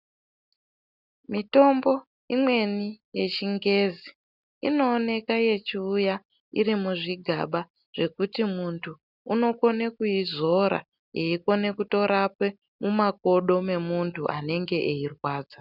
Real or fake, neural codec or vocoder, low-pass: real; none; 5.4 kHz